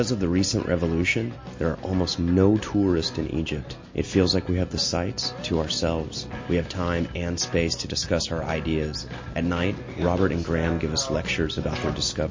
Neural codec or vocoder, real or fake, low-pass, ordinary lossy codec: none; real; 7.2 kHz; MP3, 32 kbps